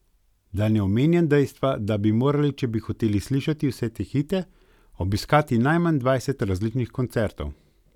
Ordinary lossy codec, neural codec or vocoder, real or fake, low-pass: none; none; real; 19.8 kHz